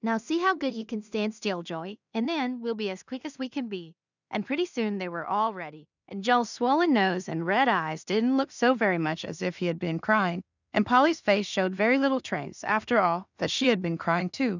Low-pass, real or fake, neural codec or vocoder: 7.2 kHz; fake; codec, 16 kHz in and 24 kHz out, 0.4 kbps, LongCat-Audio-Codec, two codebook decoder